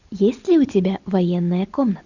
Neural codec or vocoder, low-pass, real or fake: none; 7.2 kHz; real